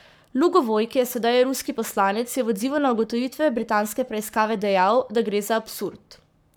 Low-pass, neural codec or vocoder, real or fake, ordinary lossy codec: none; codec, 44.1 kHz, 7.8 kbps, Pupu-Codec; fake; none